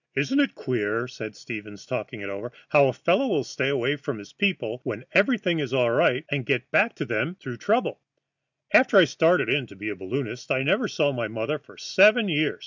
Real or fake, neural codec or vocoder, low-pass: real; none; 7.2 kHz